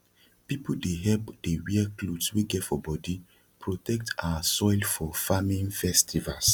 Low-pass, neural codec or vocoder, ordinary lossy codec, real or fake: 19.8 kHz; none; none; real